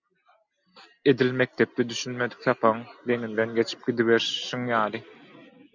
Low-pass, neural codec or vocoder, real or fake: 7.2 kHz; none; real